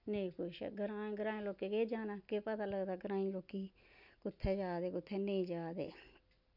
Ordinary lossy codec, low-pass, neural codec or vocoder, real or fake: none; 5.4 kHz; none; real